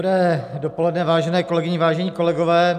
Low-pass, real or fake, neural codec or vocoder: 14.4 kHz; real; none